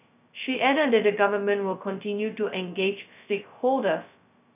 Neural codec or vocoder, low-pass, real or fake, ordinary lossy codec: codec, 16 kHz, 0.2 kbps, FocalCodec; 3.6 kHz; fake; none